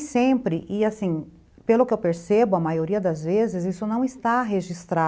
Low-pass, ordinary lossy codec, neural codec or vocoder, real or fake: none; none; none; real